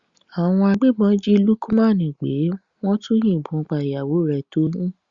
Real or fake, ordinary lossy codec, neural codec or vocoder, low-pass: real; Opus, 64 kbps; none; 7.2 kHz